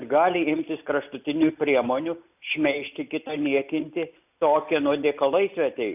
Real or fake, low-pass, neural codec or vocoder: real; 3.6 kHz; none